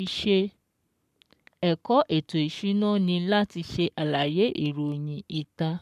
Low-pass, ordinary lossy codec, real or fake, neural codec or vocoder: 14.4 kHz; none; fake; codec, 44.1 kHz, 7.8 kbps, Pupu-Codec